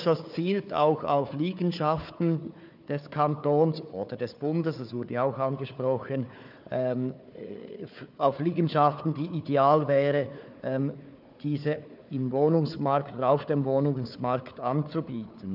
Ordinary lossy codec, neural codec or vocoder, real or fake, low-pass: none; codec, 16 kHz, 4 kbps, FunCodec, trained on Chinese and English, 50 frames a second; fake; 5.4 kHz